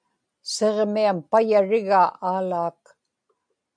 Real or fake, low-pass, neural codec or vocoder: real; 9.9 kHz; none